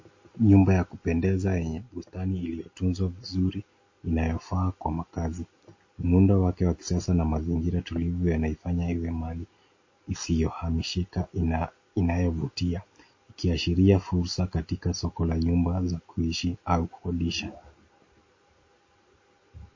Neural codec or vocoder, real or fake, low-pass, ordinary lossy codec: none; real; 7.2 kHz; MP3, 32 kbps